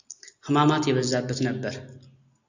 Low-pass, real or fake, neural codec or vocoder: 7.2 kHz; real; none